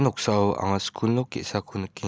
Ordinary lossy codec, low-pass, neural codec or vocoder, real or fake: none; none; none; real